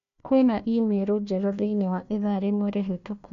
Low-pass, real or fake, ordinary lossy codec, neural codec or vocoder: 7.2 kHz; fake; MP3, 64 kbps; codec, 16 kHz, 1 kbps, FunCodec, trained on Chinese and English, 50 frames a second